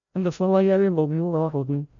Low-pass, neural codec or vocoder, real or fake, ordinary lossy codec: 7.2 kHz; codec, 16 kHz, 0.5 kbps, FreqCodec, larger model; fake; none